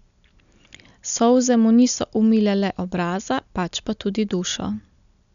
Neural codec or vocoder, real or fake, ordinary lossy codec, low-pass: none; real; none; 7.2 kHz